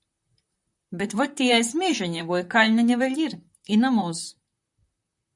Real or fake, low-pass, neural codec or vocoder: fake; 10.8 kHz; vocoder, 44.1 kHz, 128 mel bands, Pupu-Vocoder